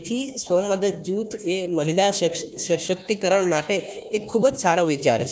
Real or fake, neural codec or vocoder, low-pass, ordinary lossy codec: fake; codec, 16 kHz, 1 kbps, FunCodec, trained on Chinese and English, 50 frames a second; none; none